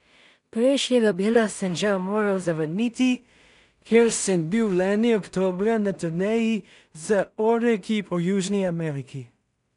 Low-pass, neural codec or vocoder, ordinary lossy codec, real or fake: 10.8 kHz; codec, 16 kHz in and 24 kHz out, 0.4 kbps, LongCat-Audio-Codec, two codebook decoder; none; fake